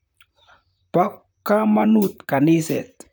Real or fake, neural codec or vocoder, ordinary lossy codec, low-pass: fake; vocoder, 44.1 kHz, 128 mel bands, Pupu-Vocoder; none; none